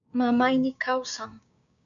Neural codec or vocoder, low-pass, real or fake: codec, 16 kHz, 6 kbps, DAC; 7.2 kHz; fake